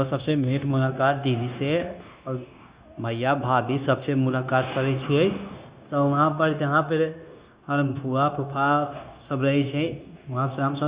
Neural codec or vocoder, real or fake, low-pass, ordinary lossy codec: codec, 16 kHz, 0.9 kbps, LongCat-Audio-Codec; fake; 3.6 kHz; Opus, 64 kbps